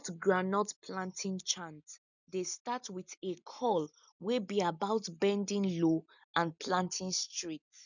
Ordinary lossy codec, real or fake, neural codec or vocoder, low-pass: none; real; none; 7.2 kHz